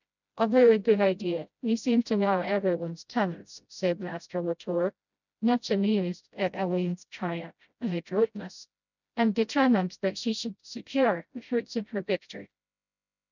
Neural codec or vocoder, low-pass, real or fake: codec, 16 kHz, 0.5 kbps, FreqCodec, smaller model; 7.2 kHz; fake